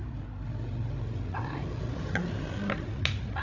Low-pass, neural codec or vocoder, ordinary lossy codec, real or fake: 7.2 kHz; codec, 16 kHz, 8 kbps, FreqCodec, larger model; none; fake